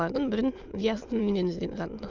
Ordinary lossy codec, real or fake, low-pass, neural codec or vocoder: Opus, 24 kbps; fake; 7.2 kHz; autoencoder, 22.05 kHz, a latent of 192 numbers a frame, VITS, trained on many speakers